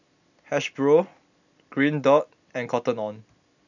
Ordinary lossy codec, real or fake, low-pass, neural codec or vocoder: none; real; 7.2 kHz; none